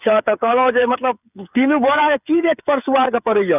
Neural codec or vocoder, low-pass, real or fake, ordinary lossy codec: codec, 16 kHz, 8 kbps, FreqCodec, smaller model; 3.6 kHz; fake; none